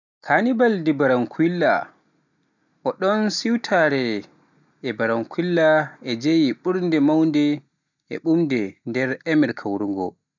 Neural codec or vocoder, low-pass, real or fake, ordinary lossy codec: none; 7.2 kHz; real; none